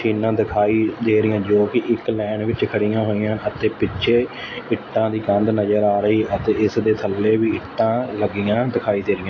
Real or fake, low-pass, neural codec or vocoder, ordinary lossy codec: real; 7.2 kHz; none; AAC, 32 kbps